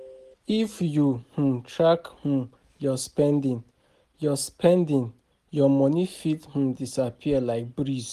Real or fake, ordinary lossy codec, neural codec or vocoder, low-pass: real; Opus, 32 kbps; none; 14.4 kHz